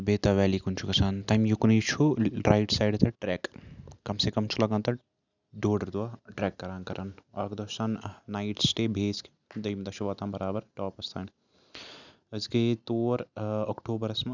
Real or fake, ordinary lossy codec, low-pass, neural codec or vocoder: real; none; 7.2 kHz; none